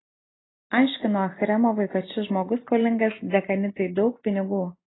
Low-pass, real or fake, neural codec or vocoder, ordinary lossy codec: 7.2 kHz; real; none; AAC, 16 kbps